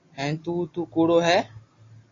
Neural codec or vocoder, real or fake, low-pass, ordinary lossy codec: none; real; 7.2 kHz; AAC, 32 kbps